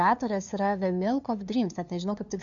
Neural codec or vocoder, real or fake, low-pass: codec, 16 kHz, 2 kbps, FunCodec, trained on LibriTTS, 25 frames a second; fake; 7.2 kHz